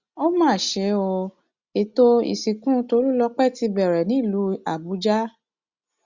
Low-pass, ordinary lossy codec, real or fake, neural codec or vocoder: 7.2 kHz; Opus, 64 kbps; real; none